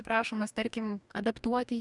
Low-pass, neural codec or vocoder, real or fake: 10.8 kHz; codec, 44.1 kHz, 2.6 kbps, DAC; fake